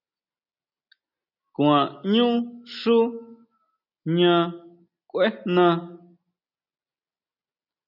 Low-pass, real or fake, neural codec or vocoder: 5.4 kHz; real; none